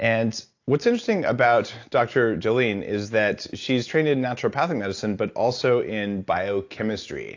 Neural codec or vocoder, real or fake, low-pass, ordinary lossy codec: none; real; 7.2 kHz; AAC, 48 kbps